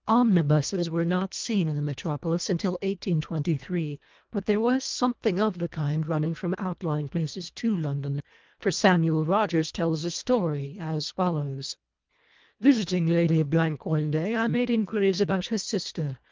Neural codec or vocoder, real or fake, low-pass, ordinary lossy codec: codec, 24 kHz, 1.5 kbps, HILCodec; fake; 7.2 kHz; Opus, 32 kbps